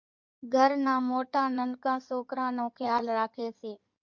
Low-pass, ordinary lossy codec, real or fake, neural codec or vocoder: 7.2 kHz; MP3, 48 kbps; fake; codec, 16 kHz in and 24 kHz out, 2.2 kbps, FireRedTTS-2 codec